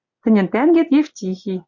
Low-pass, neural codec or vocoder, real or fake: 7.2 kHz; none; real